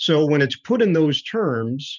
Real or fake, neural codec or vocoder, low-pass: fake; vocoder, 44.1 kHz, 128 mel bands every 512 samples, BigVGAN v2; 7.2 kHz